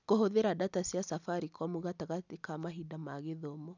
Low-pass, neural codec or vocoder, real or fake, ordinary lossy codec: 7.2 kHz; none; real; none